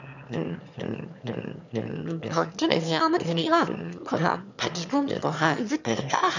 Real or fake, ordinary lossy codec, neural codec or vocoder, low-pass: fake; none; autoencoder, 22.05 kHz, a latent of 192 numbers a frame, VITS, trained on one speaker; 7.2 kHz